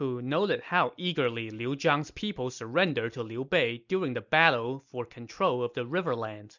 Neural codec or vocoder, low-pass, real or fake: none; 7.2 kHz; real